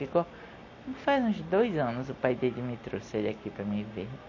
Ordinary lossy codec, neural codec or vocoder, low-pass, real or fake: MP3, 32 kbps; none; 7.2 kHz; real